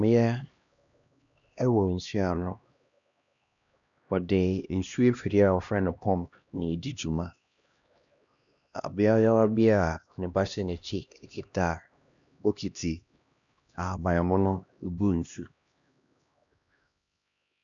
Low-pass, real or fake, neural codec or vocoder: 7.2 kHz; fake; codec, 16 kHz, 1 kbps, X-Codec, HuBERT features, trained on LibriSpeech